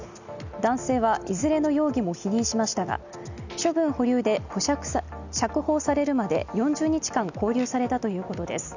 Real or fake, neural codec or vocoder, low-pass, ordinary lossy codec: real; none; 7.2 kHz; none